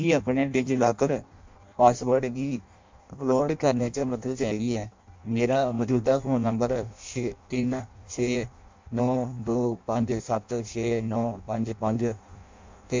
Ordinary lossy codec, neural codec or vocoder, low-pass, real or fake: MP3, 64 kbps; codec, 16 kHz in and 24 kHz out, 0.6 kbps, FireRedTTS-2 codec; 7.2 kHz; fake